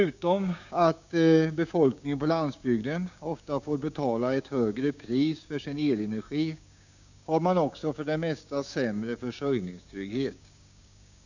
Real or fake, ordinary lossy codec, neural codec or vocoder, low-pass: fake; none; codec, 16 kHz, 6 kbps, DAC; 7.2 kHz